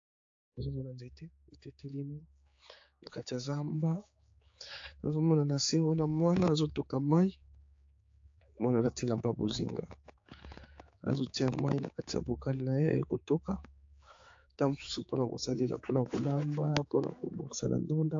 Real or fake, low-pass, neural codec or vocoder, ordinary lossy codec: fake; 7.2 kHz; codec, 16 kHz, 4 kbps, X-Codec, HuBERT features, trained on general audio; AAC, 48 kbps